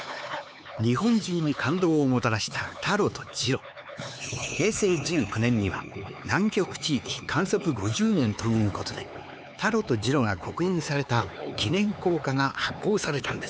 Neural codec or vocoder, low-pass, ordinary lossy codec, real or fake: codec, 16 kHz, 4 kbps, X-Codec, HuBERT features, trained on LibriSpeech; none; none; fake